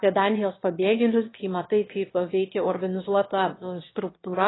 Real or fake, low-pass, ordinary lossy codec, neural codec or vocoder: fake; 7.2 kHz; AAC, 16 kbps; autoencoder, 22.05 kHz, a latent of 192 numbers a frame, VITS, trained on one speaker